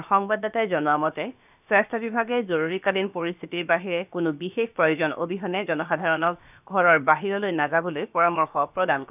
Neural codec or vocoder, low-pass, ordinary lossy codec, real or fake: codec, 16 kHz, about 1 kbps, DyCAST, with the encoder's durations; 3.6 kHz; none; fake